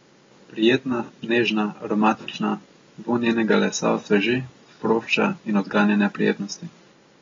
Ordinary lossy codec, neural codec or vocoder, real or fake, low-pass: AAC, 24 kbps; none; real; 7.2 kHz